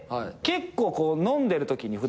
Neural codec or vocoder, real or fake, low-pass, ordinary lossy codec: none; real; none; none